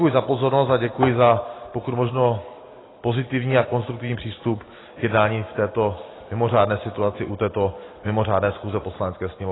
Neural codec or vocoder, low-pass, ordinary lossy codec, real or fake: none; 7.2 kHz; AAC, 16 kbps; real